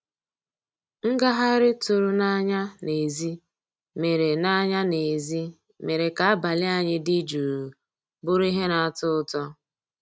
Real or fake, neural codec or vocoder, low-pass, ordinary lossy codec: real; none; none; none